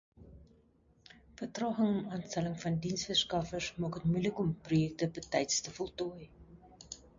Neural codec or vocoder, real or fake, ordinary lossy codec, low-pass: none; real; MP3, 48 kbps; 7.2 kHz